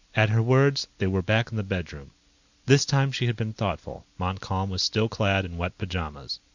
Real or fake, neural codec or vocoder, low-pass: real; none; 7.2 kHz